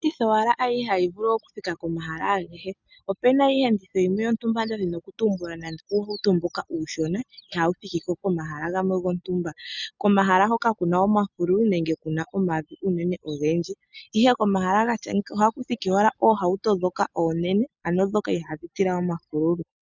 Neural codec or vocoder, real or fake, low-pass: none; real; 7.2 kHz